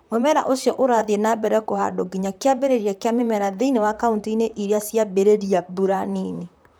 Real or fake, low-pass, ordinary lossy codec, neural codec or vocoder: fake; none; none; vocoder, 44.1 kHz, 128 mel bands, Pupu-Vocoder